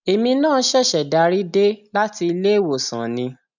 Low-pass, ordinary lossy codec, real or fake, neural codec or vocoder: 7.2 kHz; none; real; none